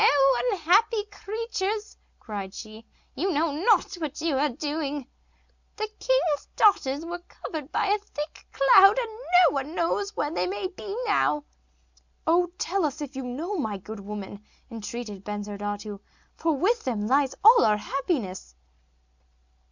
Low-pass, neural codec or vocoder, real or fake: 7.2 kHz; none; real